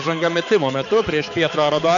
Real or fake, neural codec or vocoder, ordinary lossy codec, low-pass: fake; codec, 16 kHz, 4 kbps, X-Codec, HuBERT features, trained on balanced general audio; MP3, 96 kbps; 7.2 kHz